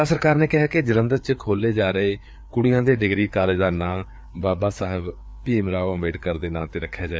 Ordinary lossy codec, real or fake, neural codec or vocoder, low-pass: none; fake; codec, 16 kHz, 4 kbps, FreqCodec, larger model; none